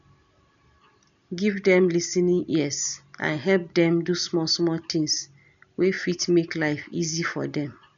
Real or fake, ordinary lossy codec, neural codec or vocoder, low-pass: real; none; none; 7.2 kHz